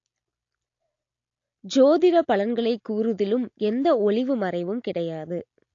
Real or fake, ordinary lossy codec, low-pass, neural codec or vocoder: real; AAC, 48 kbps; 7.2 kHz; none